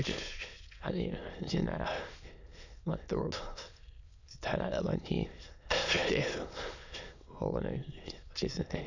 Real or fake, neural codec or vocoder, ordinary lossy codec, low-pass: fake; autoencoder, 22.05 kHz, a latent of 192 numbers a frame, VITS, trained on many speakers; none; 7.2 kHz